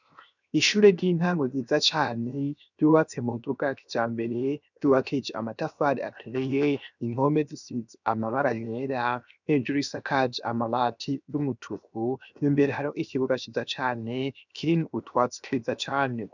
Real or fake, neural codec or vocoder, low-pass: fake; codec, 16 kHz, 0.7 kbps, FocalCodec; 7.2 kHz